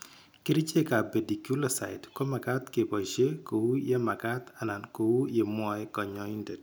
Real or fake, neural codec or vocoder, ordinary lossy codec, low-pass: real; none; none; none